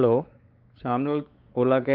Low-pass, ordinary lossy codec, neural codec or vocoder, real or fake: 5.4 kHz; Opus, 32 kbps; codec, 16 kHz, 4 kbps, X-Codec, WavLM features, trained on Multilingual LibriSpeech; fake